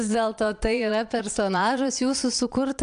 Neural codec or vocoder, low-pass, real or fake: vocoder, 22.05 kHz, 80 mel bands, Vocos; 9.9 kHz; fake